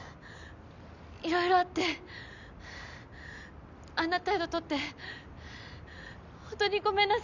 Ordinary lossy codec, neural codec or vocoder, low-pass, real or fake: none; none; 7.2 kHz; real